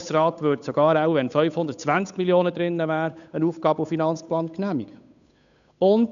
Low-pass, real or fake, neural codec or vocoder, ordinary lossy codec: 7.2 kHz; fake; codec, 16 kHz, 8 kbps, FunCodec, trained on Chinese and English, 25 frames a second; none